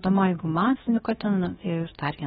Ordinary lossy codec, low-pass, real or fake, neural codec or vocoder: AAC, 16 kbps; 7.2 kHz; fake; codec, 16 kHz, about 1 kbps, DyCAST, with the encoder's durations